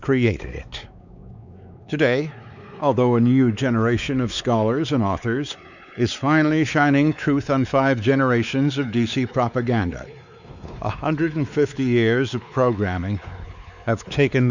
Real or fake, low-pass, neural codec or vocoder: fake; 7.2 kHz; codec, 16 kHz, 4 kbps, X-Codec, WavLM features, trained on Multilingual LibriSpeech